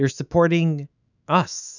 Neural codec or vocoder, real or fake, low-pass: autoencoder, 48 kHz, 128 numbers a frame, DAC-VAE, trained on Japanese speech; fake; 7.2 kHz